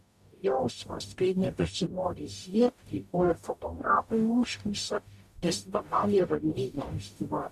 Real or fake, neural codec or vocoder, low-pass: fake; codec, 44.1 kHz, 0.9 kbps, DAC; 14.4 kHz